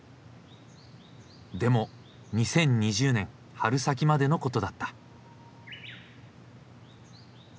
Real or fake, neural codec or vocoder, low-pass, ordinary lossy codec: real; none; none; none